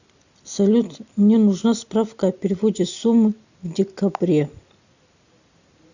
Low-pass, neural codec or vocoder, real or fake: 7.2 kHz; none; real